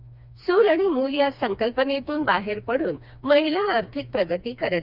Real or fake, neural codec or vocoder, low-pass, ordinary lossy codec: fake; codec, 16 kHz, 2 kbps, FreqCodec, smaller model; 5.4 kHz; none